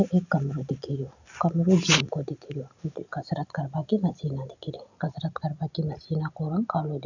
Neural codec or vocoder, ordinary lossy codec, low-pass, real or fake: none; none; 7.2 kHz; real